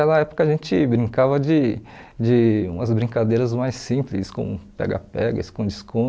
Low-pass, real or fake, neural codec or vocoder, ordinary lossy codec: none; real; none; none